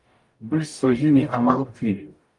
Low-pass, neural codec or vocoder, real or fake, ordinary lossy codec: 10.8 kHz; codec, 44.1 kHz, 0.9 kbps, DAC; fake; Opus, 32 kbps